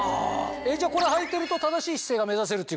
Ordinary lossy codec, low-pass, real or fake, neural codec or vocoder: none; none; real; none